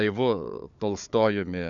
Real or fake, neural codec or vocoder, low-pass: fake; codec, 16 kHz, 4 kbps, FunCodec, trained on Chinese and English, 50 frames a second; 7.2 kHz